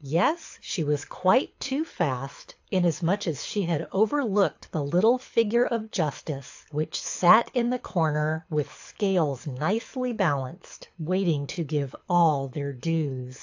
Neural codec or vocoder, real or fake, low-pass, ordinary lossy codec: codec, 24 kHz, 6 kbps, HILCodec; fake; 7.2 kHz; AAC, 48 kbps